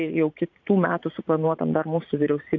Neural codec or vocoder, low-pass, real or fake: vocoder, 44.1 kHz, 80 mel bands, Vocos; 7.2 kHz; fake